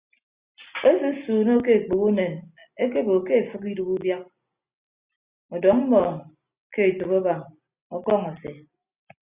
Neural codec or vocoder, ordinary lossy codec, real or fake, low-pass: none; Opus, 64 kbps; real; 3.6 kHz